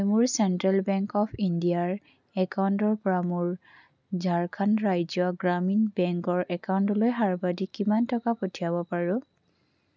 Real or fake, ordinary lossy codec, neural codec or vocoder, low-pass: real; none; none; 7.2 kHz